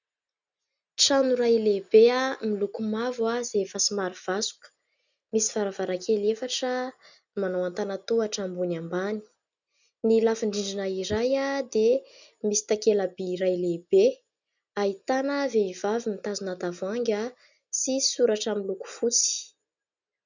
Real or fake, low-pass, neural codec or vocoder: real; 7.2 kHz; none